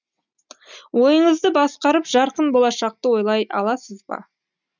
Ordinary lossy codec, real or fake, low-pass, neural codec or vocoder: none; real; 7.2 kHz; none